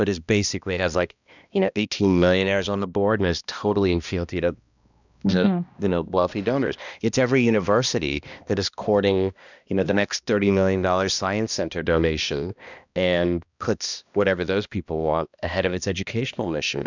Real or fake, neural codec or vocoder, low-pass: fake; codec, 16 kHz, 1 kbps, X-Codec, HuBERT features, trained on balanced general audio; 7.2 kHz